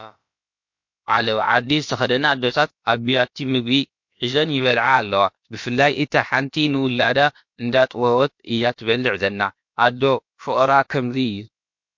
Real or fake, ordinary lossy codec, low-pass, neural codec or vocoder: fake; MP3, 48 kbps; 7.2 kHz; codec, 16 kHz, about 1 kbps, DyCAST, with the encoder's durations